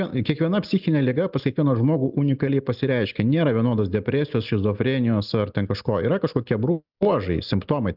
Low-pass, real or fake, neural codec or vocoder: 5.4 kHz; real; none